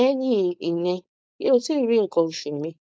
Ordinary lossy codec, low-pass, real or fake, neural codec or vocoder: none; none; fake; codec, 16 kHz, 4.8 kbps, FACodec